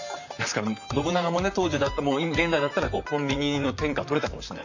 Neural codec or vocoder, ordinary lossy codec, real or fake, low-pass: vocoder, 44.1 kHz, 128 mel bands, Pupu-Vocoder; none; fake; 7.2 kHz